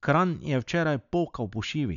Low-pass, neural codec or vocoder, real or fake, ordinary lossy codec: 7.2 kHz; none; real; none